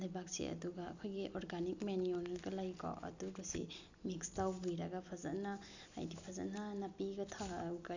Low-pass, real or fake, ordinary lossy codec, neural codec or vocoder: 7.2 kHz; real; none; none